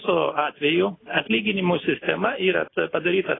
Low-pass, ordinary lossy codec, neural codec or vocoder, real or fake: 7.2 kHz; AAC, 16 kbps; none; real